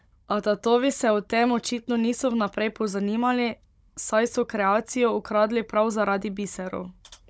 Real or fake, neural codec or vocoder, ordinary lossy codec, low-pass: fake; codec, 16 kHz, 16 kbps, FunCodec, trained on Chinese and English, 50 frames a second; none; none